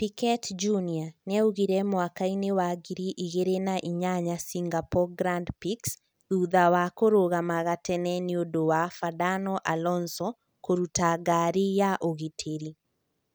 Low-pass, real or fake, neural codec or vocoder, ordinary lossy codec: none; real; none; none